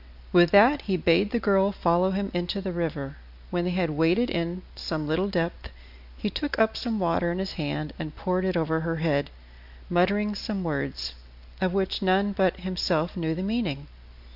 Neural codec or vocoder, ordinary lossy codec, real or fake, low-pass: none; AAC, 48 kbps; real; 5.4 kHz